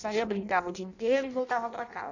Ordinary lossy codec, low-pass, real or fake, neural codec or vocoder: none; 7.2 kHz; fake; codec, 16 kHz in and 24 kHz out, 0.6 kbps, FireRedTTS-2 codec